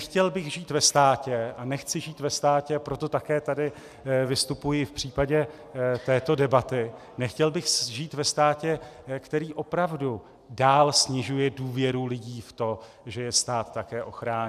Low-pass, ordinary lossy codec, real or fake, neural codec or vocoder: 14.4 kHz; AAC, 96 kbps; real; none